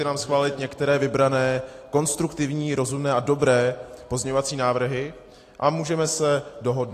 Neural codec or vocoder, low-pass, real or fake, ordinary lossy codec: none; 14.4 kHz; real; AAC, 48 kbps